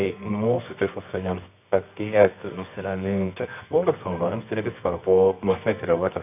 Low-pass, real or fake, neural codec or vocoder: 3.6 kHz; fake; codec, 24 kHz, 0.9 kbps, WavTokenizer, medium music audio release